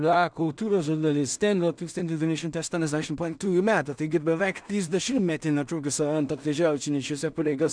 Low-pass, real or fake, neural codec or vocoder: 9.9 kHz; fake; codec, 16 kHz in and 24 kHz out, 0.4 kbps, LongCat-Audio-Codec, two codebook decoder